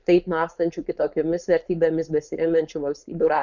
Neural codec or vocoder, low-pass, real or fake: codec, 16 kHz, 4.8 kbps, FACodec; 7.2 kHz; fake